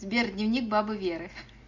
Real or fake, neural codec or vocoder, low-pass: real; none; 7.2 kHz